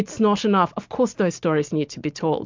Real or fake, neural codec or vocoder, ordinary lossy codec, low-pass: real; none; MP3, 64 kbps; 7.2 kHz